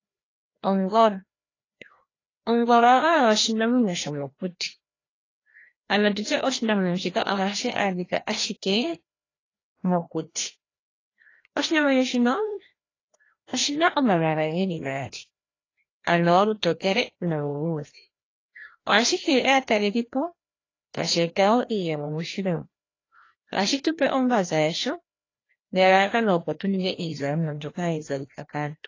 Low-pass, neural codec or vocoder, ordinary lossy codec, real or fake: 7.2 kHz; codec, 16 kHz, 1 kbps, FreqCodec, larger model; AAC, 32 kbps; fake